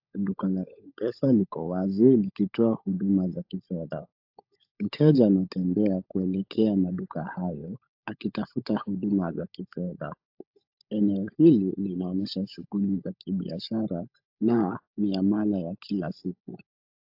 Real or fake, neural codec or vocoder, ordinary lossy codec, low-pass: fake; codec, 16 kHz, 16 kbps, FunCodec, trained on LibriTTS, 50 frames a second; AAC, 48 kbps; 5.4 kHz